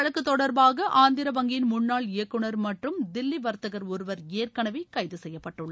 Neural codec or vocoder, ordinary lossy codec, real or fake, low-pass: none; none; real; none